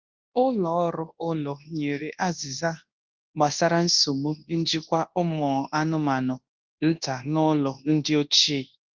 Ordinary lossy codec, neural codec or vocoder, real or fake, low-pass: Opus, 24 kbps; codec, 24 kHz, 0.9 kbps, WavTokenizer, large speech release; fake; 7.2 kHz